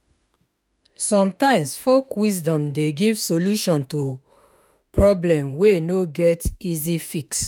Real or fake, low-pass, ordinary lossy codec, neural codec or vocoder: fake; none; none; autoencoder, 48 kHz, 32 numbers a frame, DAC-VAE, trained on Japanese speech